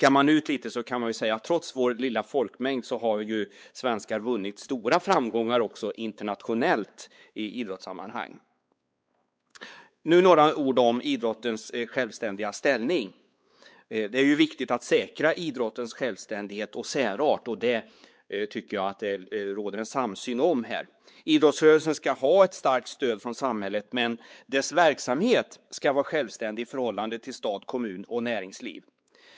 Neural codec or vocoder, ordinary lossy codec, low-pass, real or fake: codec, 16 kHz, 4 kbps, X-Codec, WavLM features, trained on Multilingual LibriSpeech; none; none; fake